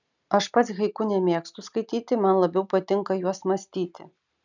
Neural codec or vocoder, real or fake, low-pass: none; real; 7.2 kHz